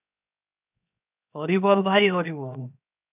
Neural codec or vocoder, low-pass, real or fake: codec, 16 kHz, 0.7 kbps, FocalCodec; 3.6 kHz; fake